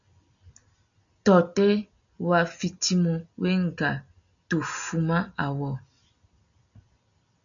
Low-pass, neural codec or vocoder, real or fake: 7.2 kHz; none; real